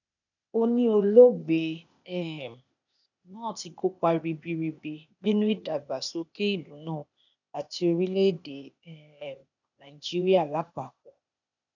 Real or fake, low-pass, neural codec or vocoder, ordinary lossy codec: fake; 7.2 kHz; codec, 16 kHz, 0.8 kbps, ZipCodec; none